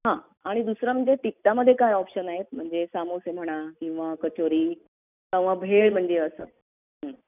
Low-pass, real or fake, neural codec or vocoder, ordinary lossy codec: 3.6 kHz; real; none; none